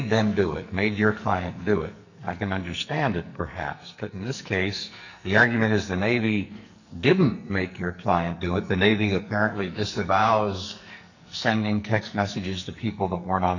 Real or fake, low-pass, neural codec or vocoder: fake; 7.2 kHz; codec, 44.1 kHz, 2.6 kbps, SNAC